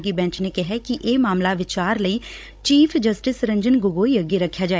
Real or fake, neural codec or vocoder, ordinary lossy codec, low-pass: fake; codec, 16 kHz, 16 kbps, FunCodec, trained on Chinese and English, 50 frames a second; none; none